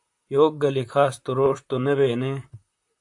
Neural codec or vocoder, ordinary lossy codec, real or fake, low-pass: vocoder, 44.1 kHz, 128 mel bands, Pupu-Vocoder; AAC, 64 kbps; fake; 10.8 kHz